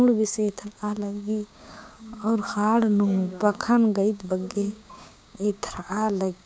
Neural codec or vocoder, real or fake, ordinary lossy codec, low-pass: codec, 16 kHz, 6 kbps, DAC; fake; none; none